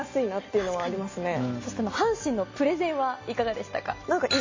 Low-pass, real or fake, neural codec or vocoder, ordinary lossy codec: 7.2 kHz; real; none; MP3, 32 kbps